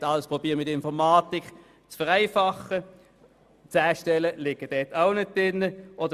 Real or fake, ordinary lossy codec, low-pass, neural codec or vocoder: real; Opus, 64 kbps; 14.4 kHz; none